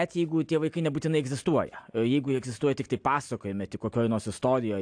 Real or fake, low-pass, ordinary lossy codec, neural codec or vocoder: real; 9.9 kHz; AAC, 64 kbps; none